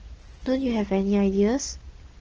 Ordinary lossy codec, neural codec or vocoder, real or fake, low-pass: Opus, 16 kbps; none; real; 7.2 kHz